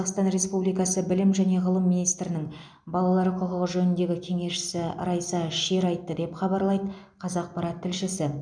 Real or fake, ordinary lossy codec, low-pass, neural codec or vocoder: real; none; 9.9 kHz; none